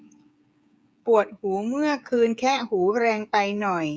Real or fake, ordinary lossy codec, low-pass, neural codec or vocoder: fake; none; none; codec, 16 kHz, 16 kbps, FreqCodec, smaller model